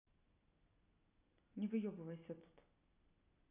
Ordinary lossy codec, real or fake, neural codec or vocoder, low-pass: none; real; none; 3.6 kHz